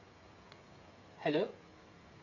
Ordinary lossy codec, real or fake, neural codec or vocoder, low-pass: none; fake; codec, 16 kHz, 16 kbps, FreqCodec, smaller model; 7.2 kHz